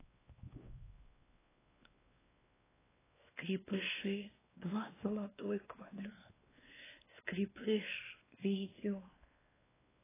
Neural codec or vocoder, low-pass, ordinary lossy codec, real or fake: codec, 16 kHz, 2 kbps, X-Codec, WavLM features, trained on Multilingual LibriSpeech; 3.6 kHz; AAC, 16 kbps; fake